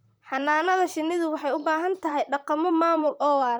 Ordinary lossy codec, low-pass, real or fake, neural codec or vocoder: none; none; fake; vocoder, 44.1 kHz, 128 mel bands, Pupu-Vocoder